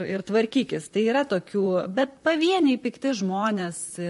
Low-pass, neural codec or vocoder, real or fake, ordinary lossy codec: 14.4 kHz; vocoder, 44.1 kHz, 128 mel bands, Pupu-Vocoder; fake; MP3, 48 kbps